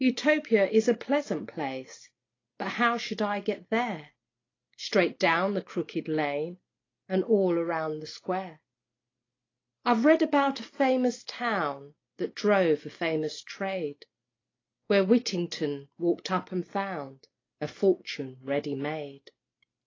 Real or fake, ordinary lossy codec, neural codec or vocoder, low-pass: real; AAC, 32 kbps; none; 7.2 kHz